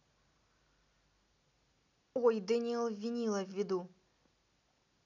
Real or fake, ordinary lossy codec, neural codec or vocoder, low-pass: real; none; none; 7.2 kHz